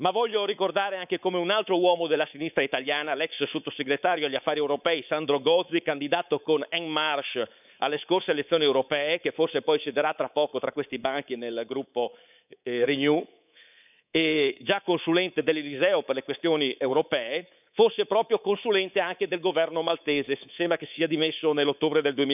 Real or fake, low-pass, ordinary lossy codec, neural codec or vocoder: fake; 3.6 kHz; none; codec, 24 kHz, 3.1 kbps, DualCodec